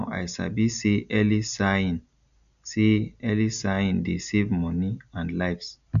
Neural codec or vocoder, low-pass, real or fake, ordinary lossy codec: none; 7.2 kHz; real; none